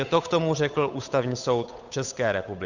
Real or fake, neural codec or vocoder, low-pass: fake; codec, 16 kHz, 8 kbps, FunCodec, trained on Chinese and English, 25 frames a second; 7.2 kHz